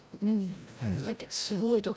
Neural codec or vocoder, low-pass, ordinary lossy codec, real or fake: codec, 16 kHz, 0.5 kbps, FreqCodec, larger model; none; none; fake